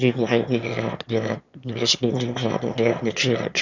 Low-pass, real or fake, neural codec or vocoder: 7.2 kHz; fake; autoencoder, 22.05 kHz, a latent of 192 numbers a frame, VITS, trained on one speaker